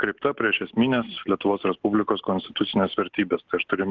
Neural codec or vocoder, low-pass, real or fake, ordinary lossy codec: none; 7.2 kHz; real; Opus, 16 kbps